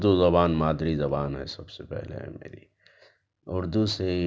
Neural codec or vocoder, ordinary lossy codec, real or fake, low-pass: none; none; real; none